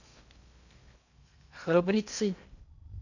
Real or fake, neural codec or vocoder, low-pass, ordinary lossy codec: fake; codec, 16 kHz in and 24 kHz out, 0.6 kbps, FocalCodec, streaming, 2048 codes; 7.2 kHz; none